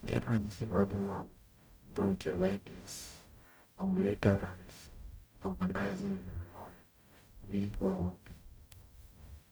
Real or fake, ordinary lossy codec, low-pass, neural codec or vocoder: fake; none; none; codec, 44.1 kHz, 0.9 kbps, DAC